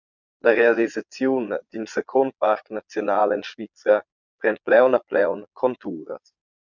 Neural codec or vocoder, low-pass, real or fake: vocoder, 22.05 kHz, 80 mel bands, Vocos; 7.2 kHz; fake